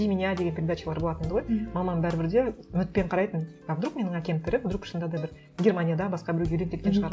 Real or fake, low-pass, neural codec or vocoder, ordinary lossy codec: real; none; none; none